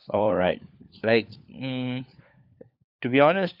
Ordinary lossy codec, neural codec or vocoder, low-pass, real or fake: none; codec, 16 kHz, 4 kbps, FunCodec, trained on LibriTTS, 50 frames a second; 5.4 kHz; fake